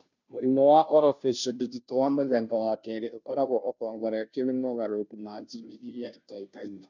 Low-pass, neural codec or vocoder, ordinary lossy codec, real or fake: 7.2 kHz; codec, 16 kHz, 0.5 kbps, FunCodec, trained on Chinese and English, 25 frames a second; none; fake